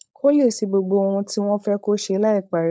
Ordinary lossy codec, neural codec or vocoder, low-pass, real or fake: none; codec, 16 kHz, 4.8 kbps, FACodec; none; fake